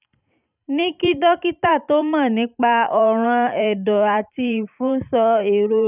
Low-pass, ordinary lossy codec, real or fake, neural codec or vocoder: 3.6 kHz; none; fake; vocoder, 44.1 kHz, 128 mel bands every 512 samples, BigVGAN v2